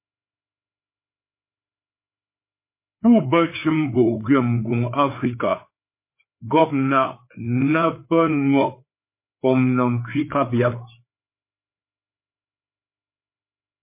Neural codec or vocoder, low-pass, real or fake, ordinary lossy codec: codec, 16 kHz, 4 kbps, FreqCodec, larger model; 3.6 kHz; fake; MP3, 24 kbps